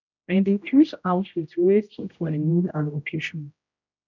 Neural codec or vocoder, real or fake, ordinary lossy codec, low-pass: codec, 16 kHz, 0.5 kbps, X-Codec, HuBERT features, trained on general audio; fake; none; 7.2 kHz